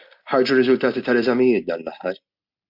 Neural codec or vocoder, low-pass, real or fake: none; 5.4 kHz; real